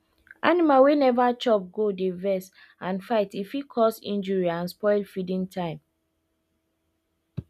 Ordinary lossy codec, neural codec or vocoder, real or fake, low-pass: none; none; real; 14.4 kHz